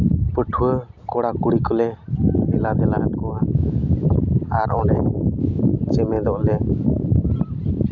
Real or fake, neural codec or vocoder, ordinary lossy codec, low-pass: real; none; none; 7.2 kHz